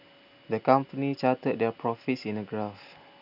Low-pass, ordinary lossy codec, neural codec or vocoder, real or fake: 5.4 kHz; none; none; real